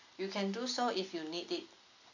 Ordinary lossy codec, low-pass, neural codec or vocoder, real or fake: none; 7.2 kHz; none; real